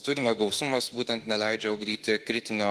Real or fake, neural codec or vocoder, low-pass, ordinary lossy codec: fake; autoencoder, 48 kHz, 32 numbers a frame, DAC-VAE, trained on Japanese speech; 14.4 kHz; Opus, 24 kbps